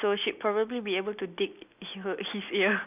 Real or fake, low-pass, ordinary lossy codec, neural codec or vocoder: real; 3.6 kHz; none; none